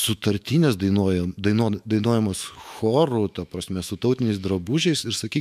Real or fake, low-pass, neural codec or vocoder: fake; 14.4 kHz; autoencoder, 48 kHz, 128 numbers a frame, DAC-VAE, trained on Japanese speech